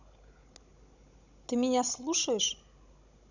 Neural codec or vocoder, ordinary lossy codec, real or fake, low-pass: codec, 16 kHz, 16 kbps, FunCodec, trained on Chinese and English, 50 frames a second; none; fake; 7.2 kHz